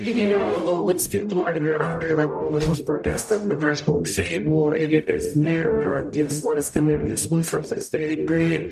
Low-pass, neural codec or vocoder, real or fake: 14.4 kHz; codec, 44.1 kHz, 0.9 kbps, DAC; fake